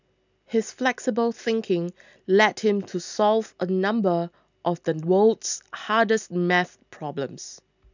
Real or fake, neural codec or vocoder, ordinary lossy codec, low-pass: real; none; none; 7.2 kHz